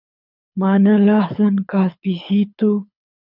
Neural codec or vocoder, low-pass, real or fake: codec, 24 kHz, 6 kbps, HILCodec; 5.4 kHz; fake